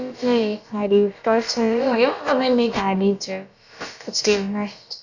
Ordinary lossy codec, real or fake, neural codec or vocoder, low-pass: none; fake; codec, 16 kHz, about 1 kbps, DyCAST, with the encoder's durations; 7.2 kHz